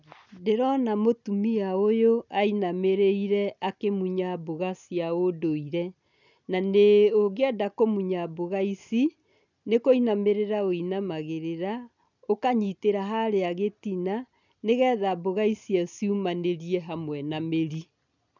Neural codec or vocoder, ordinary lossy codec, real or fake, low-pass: none; none; real; 7.2 kHz